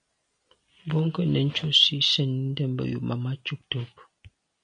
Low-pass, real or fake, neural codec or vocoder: 9.9 kHz; real; none